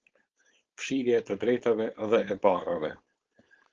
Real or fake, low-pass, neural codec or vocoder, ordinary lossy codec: fake; 7.2 kHz; codec, 16 kHz, 4.8 kbps, FACodec; Opus, 16 kbps